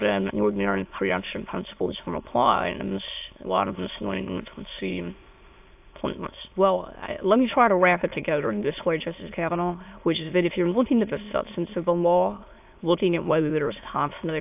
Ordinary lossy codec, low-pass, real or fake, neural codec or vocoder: AAC, 32 kbps; 3.6 kHz; fake; autoencoder, 22.05 kHz, a latent of 192 numbers a frame, VITS, trained on many speakers